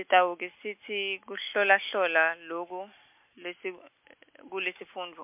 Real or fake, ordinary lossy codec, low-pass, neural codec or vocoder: real; MP3, 32 kbps; 3.6 kHz; none